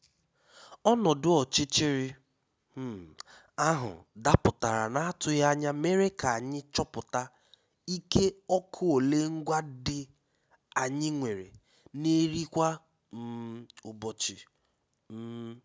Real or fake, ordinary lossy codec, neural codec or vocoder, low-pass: real; none; none; none